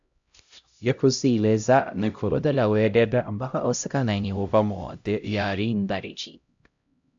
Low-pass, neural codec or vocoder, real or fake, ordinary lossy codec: 7.2 kHz; codec, 16 kHz, 0.5 kbps, X-Codec, HuBERT features, trained on LibriSpeech; fake; AAC, 64 kbps